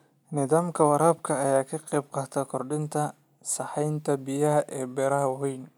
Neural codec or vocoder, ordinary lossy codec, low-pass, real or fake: none; none; none; real